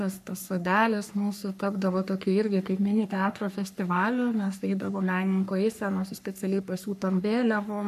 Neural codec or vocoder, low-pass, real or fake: codec, 44.1 kHz, 3.4 kbps, Pupu-Codec; 14.4 kHz; fake